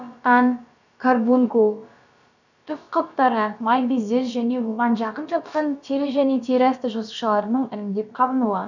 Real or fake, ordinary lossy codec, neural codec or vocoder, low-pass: fake; none; codec, 16 kHz, about 1 kbps, DyCAST, with the encoder's durations; 7.2 kHz